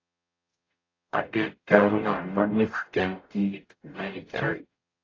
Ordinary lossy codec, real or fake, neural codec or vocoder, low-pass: AAC, 32 kbps; fake; codec, 44.1 kHz, 0.9 kbps, DAC; 7.2 kHz